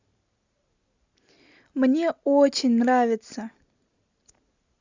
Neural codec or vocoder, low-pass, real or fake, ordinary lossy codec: none; 7.2 kHz; real; Opus, 64 kbps